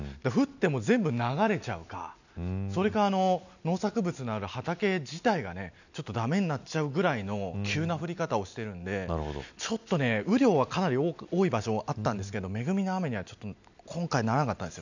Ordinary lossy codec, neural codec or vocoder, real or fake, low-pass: none; none; real; 7.2 kHz